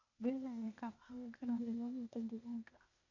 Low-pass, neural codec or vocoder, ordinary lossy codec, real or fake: 7.2 kHz; codec, 16 kHz, 0.8 kbps, ZipCodec; none; fake